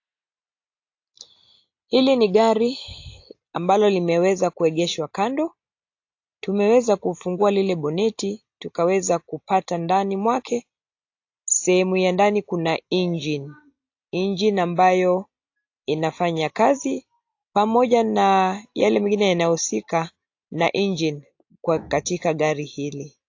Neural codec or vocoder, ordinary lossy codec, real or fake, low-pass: none; AAC, 48 kbps; real; 7.2 kHz